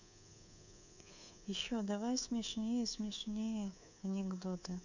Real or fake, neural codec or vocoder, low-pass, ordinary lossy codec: fake; codec, 24 kHz, 3.1 kbps, DualCodec; 7.2 kHz; none